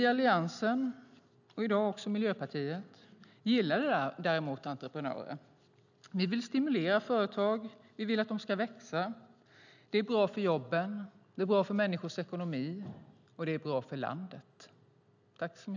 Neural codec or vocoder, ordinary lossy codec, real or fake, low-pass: none; none; real; 7.2 kHz